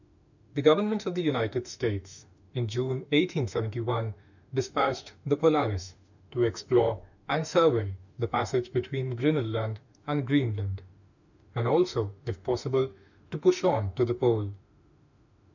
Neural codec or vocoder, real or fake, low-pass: autoencoder, 48 kHz, 32 numbers a frame, DAC-VAE, trained on Japanese speech; fake; 7.2 kHz